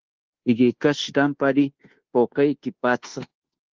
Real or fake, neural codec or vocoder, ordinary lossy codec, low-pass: fake; codec, 24 kHz, 1.2 kbps, DualCodec; Opus, 16 kbps; 7.2 kHz